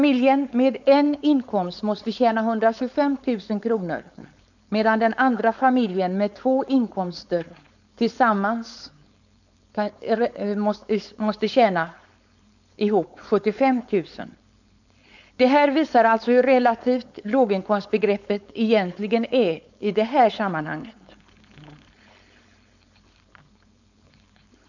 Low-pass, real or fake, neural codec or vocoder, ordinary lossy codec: 7.2 kHz; fake; codec, 16 kHz, 4.8 kbps, FACodec; none